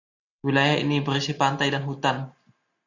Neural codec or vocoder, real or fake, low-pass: none; real; 7.2 kHz